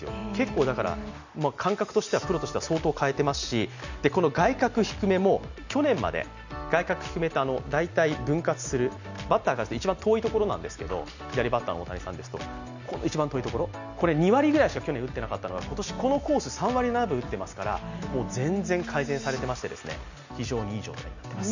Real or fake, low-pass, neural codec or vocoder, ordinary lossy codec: real; 7.2 kHz; none; none